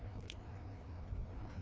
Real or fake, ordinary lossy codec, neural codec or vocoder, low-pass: fake; none; codec, 16 kHz, 2 kbps, FreqCodec, larger model; none